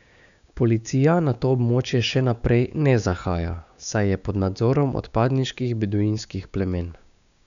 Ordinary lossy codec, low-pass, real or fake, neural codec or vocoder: none; 7.2 kHz; fake; codec, 16 kHz, 6 kbps, DAC